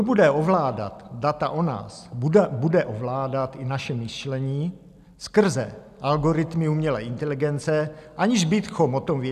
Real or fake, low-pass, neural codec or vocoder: real; 14.4 kHz; none